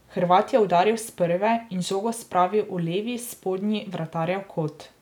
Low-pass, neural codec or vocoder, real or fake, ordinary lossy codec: 19.8 kHz; none; real; none